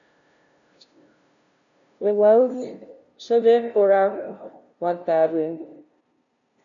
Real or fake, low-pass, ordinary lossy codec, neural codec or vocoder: fake; 7.2 kHz; MP3, 96 kbps; codec, 16 kHz, 0.5 kbps, FunCodec, trained on LibriTTS, 25 frames a second